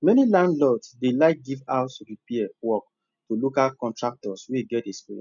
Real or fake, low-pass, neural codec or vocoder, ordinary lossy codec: real; 7.2 kHz; none; none